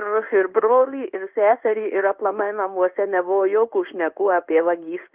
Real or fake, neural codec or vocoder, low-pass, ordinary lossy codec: fake; codec, 16 kHz in and 24 kHz out, 1 kbps, XY-Tokenizer; 3.6 kHz; Opus, 32 kbps